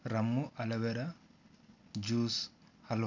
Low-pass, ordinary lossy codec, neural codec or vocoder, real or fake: 7.2 kHz; none; none; real